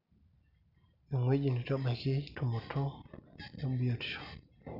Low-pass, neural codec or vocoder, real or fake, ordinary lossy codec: 5.4 kHz; none; real; none